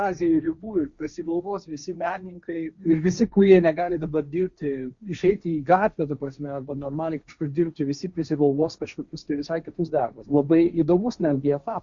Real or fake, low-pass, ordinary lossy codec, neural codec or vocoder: fake; 7.2 kHz; Opus, 64 kbps; codec, 16 kHz, 1.1 kbps, Voila-Tokenizer